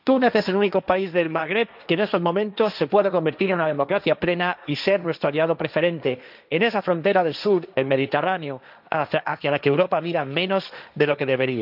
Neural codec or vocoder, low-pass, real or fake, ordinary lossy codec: codec, 16 kHz, 1.1 kbps, Voila-Tokenizer; 5.4 kHz; fake; none